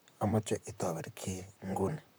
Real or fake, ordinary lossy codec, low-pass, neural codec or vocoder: fake; none; none; vocoder, 44.1 kHz, 128 mel bands, Pupu-Vocoder